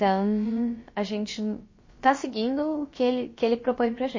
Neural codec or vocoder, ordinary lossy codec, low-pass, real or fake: codec, 16 kHz, about 1 kbps, DyCAST, with the encoder's durations; MP3, 32 kbps; 7.2 kHz; fake